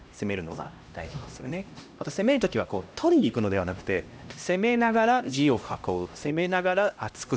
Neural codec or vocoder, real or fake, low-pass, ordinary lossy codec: codec, 16 kHz, 1 kbps, X-Codec, HuBERT features, trained on LibriSpeech; fake; none; none